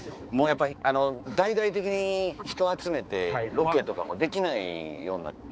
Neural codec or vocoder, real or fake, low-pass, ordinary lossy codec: codec, 16 kHz, 4 kbps, X-Codec, HuBERT features, trained on balanced general audio; fake; none; none